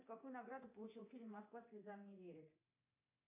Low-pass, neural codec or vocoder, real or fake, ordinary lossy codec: 3.6 kHz; codec, 24 kHz, 3.1 kbps, DualCodec; fake; MP3, 24 kbps